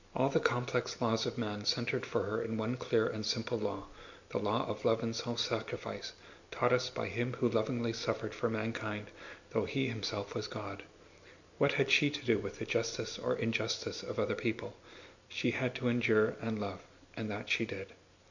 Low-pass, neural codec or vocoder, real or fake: 7.2 kHz; none; real